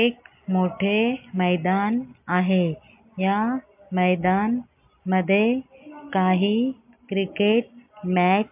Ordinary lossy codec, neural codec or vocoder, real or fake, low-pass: MP3, 32 kbps; none; real; 3.6 kHz